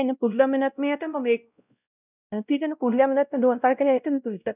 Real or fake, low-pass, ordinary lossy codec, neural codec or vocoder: fake; 3.6 kHz; none; codec, 16 kHz, 0.5 kbps, X-Codec, WavLM features, trained on Multilingual LibriSpeech